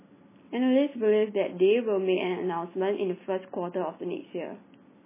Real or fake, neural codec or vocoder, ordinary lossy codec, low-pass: real; none; MP3, 16 kbps; 3.6 kHz